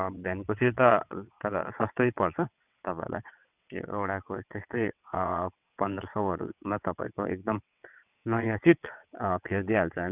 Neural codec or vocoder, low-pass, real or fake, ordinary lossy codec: vocoder, 22.05 kHz, 80 mel bands, WaveNeXt; 3.6 kHz; fake; none